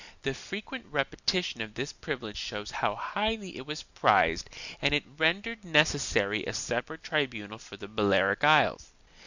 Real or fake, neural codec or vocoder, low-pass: real; none; 7.2 kHz